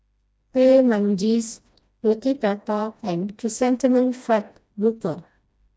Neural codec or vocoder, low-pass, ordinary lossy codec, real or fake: codec, 16 kHz, 1 kbps, FreqCodec, smaller model; none; none; fake